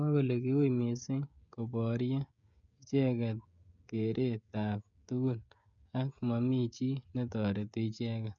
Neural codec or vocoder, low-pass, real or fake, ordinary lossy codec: codec, 16 kHz, 16 kbps, FreqCodec, smaller model; 7.2 kHz; fake; none